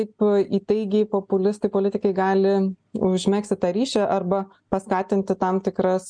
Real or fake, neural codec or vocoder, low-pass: real; none; 9.9 kHz